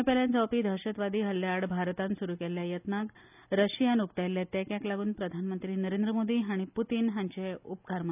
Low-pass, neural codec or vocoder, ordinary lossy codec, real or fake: 3.6 kHz; none; none; real